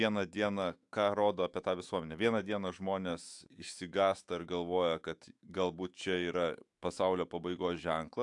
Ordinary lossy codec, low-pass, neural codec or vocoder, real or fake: AAC, 64 kbps; 10.8 kHz; autoencoder, 48 kHz, 128 numbers a frame, DAC-VAE, trained on Japanese speech; fake